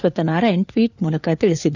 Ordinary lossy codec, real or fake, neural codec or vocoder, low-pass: none; fake; codec, 16 kHz, 4 kbps, FunCodec, trained on LibriTTS, 50 frames a second; 7.2 kHz